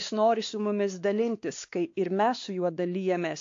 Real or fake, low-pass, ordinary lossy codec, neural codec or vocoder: fake; 7.2 kHz; AAC, 48 kbps; codec, 16 kHz, 2 kbps, X-Codec, WavLM features, trained on Multilingual LibriSpeech